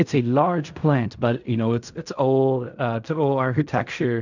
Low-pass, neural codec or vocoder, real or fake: 7.2 kHz; codec, 16 kHz in and 24 kHz out, 0.4 kbps, LongCat-Audio-Codec, fine tuned four codebook decoder; fake